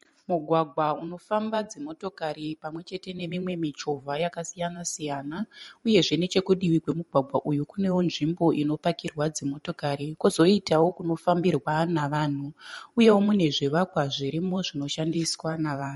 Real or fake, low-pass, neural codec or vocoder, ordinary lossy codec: fake; 19.8 kHz; vocoder, 44.1 kHz, 128 mel bands every 512 samples, BigVGAN v2; MP3, 48 kbps